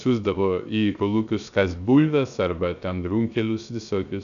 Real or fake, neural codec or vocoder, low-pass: fake; codec, 16 kHz, 0.3 kbps, FocalCodec; 7.2 kHz